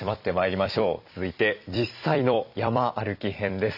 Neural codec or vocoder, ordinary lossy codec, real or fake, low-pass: vocoder, 44.1 kHz, 128 mel bands every 256 samples, BigVGAN v2; none; fake; 5.4 kHz